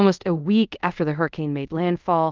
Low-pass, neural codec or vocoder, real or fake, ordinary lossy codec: 7.2 kHz; codec, 24 kHz, 0.5 kbps, DualCodec; fake; Opus, 24 kbps